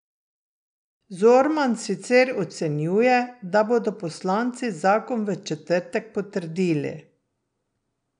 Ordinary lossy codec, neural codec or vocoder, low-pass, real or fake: none; none; 9.9 kHz; real